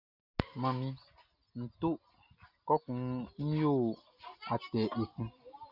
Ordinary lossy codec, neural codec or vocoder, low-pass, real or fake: Opus, 64 kbps; none; 5.4 kHz; real